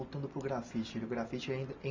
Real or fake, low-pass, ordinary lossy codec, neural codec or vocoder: real; 7.2 kHz; MP3, 64 kbps; none